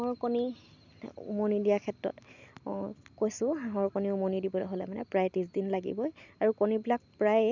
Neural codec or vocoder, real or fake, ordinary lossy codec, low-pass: none; real; none; 7.2 kHz